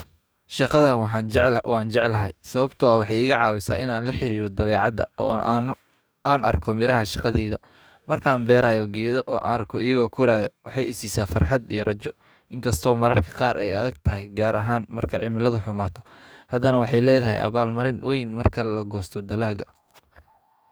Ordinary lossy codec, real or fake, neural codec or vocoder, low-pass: none; fake; codec, 44.1 kHz, 2.6 kbps, DAC; none